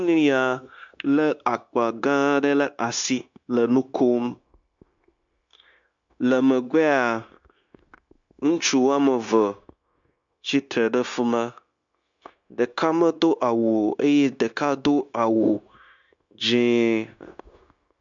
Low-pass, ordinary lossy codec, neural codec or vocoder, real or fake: 7.2 kHz; MP3, 64 kbps; codec, 16 kHz, 0.9 kbps, LongCat-Audio-Codec; fake